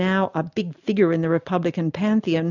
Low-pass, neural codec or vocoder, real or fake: 7.2 kHz; none; real